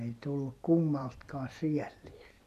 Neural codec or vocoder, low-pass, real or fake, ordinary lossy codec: vocoder, 44.1 kHz, 128 mel bands every 256 samples, BigVGAN v2; 14.4 kHz; fake; none